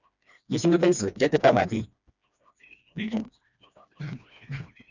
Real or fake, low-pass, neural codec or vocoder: fake; 7.2 kHz; codec, 16 kHz, 2 kbps, FreqCodec, smaller model